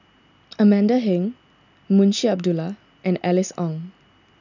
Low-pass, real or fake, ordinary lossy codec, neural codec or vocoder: 7.2 kHz; real; none; none